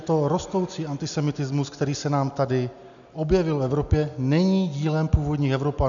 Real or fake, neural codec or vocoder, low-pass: real; none; 7.2 kHz